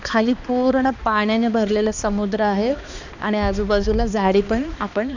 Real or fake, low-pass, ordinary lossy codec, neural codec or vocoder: fake; 7.2 kHz; none; codec, 16 kHz, 2 kbps, X-Codec, HuBERT features, trained on balanced general audio